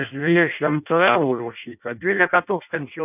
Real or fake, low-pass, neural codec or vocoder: fake; 3.6 kHz; codec, 16 kHz in and 24 kHz out, 0.6 kbps, FireRedTTS-2 codec